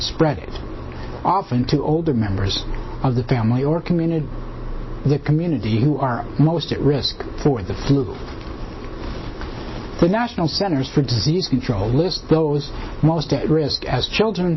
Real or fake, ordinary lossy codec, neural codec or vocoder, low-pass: real; MP3, 24 kbps; none; 7.2 kHz